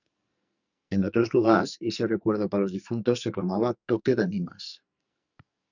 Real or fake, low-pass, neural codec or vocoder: fake; 7.2 kHz; codec, 44.1 kHz, 2.6 kbps, SNAC